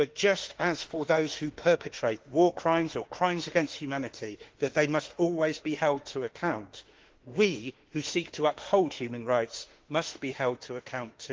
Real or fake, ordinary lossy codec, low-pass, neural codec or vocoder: fake; Opus, 16 kbps; 7.2 kHz; autoencoder, 48 kHz, 32 numbers a frame, DAC-VAE, trained on Japanese speech